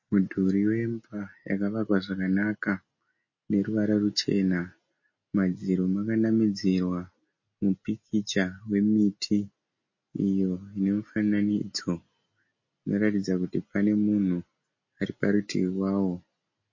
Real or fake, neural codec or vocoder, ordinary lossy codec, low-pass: real; none; MP3, 32 kbps; 7.2 kHz